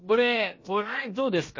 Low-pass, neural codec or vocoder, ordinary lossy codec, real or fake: 7.2 kHz; codec, 16 kHz, about 1 kbps, DyCAST, with the encoder's durations; MP3, 32 kbps; fake